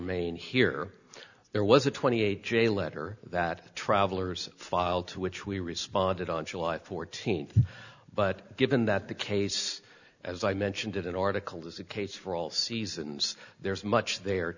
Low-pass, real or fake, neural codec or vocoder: 7.2 kHz; real; none